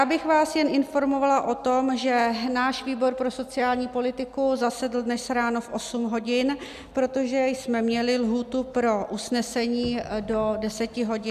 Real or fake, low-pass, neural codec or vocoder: real; 14.4 kHz; none